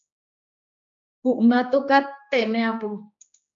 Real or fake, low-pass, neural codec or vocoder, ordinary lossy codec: fake; 7.2 kHz; codec, 16 kHz, 2 kbps, X-Codec, HuBERT features, trained on general audio; MP3, 64 kbps